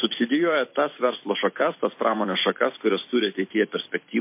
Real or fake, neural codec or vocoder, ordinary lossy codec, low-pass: fake; vocoder, 44.1 kHz, 128 mel bands every 256 samples, BigVGAN v2; MP3, 24 kbps; 3.6 kHz